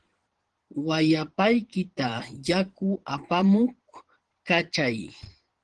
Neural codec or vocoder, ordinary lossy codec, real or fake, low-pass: vocoder, 22.05 kHz, 80 mel bands, Vocos; Opus, 16 kbps; fake; 9.9 kHz